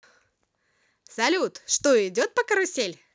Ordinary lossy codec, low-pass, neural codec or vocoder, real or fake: none; none; none; real